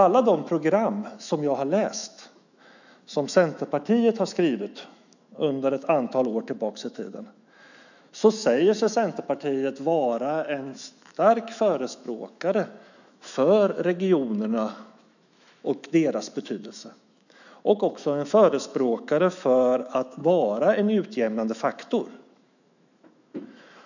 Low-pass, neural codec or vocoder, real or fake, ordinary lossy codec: 7.2 kHz; autoencoder, 48 kHz, 128 numbers a frame, DAC-VAE, trained on Japanese speech; fake; none